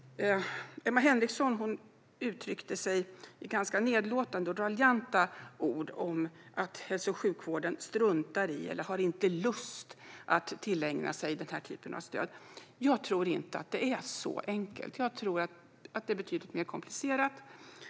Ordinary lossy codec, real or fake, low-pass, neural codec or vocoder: none; real; none; none